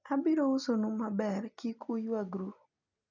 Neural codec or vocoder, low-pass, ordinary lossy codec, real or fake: none; 7.2 kHz; none; real